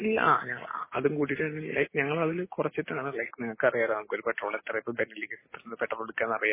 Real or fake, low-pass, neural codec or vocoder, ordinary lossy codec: real; 3.6 kHz; none; MP3, 16 kbps